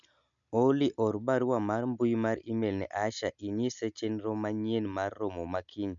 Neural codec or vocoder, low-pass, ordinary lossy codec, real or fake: none; 7.2 kHz; none; real